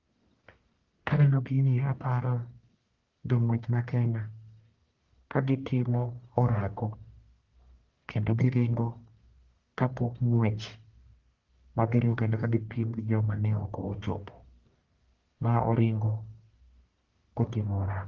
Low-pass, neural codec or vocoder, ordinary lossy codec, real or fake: 7.2 kHz; codec, 44.1 kHz, 1.7 kbps, Pupu-Codec; Opus, 32 kbps; fake